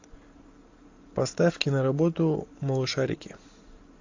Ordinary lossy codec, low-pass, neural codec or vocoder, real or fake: AAC, 48 kbps; 7.2 kHz; none; real